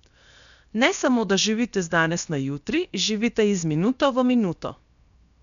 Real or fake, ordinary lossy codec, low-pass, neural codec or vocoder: fake; none; 7.2 kHz; codec, 16 kHz, 0.7 kbps, FocalCodec